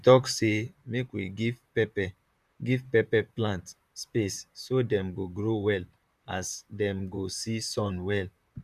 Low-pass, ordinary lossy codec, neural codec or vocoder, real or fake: 14.4 kHz; none; vocoder, 44.1 kHz, 128 mel bands, Pupu-Vocoder; fake